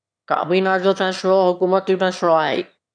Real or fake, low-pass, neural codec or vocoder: fake; 9.9 kHz; autoencoder, 22.05 kHz, a latent of 192 numbers a frame, VITS, trained on one speaker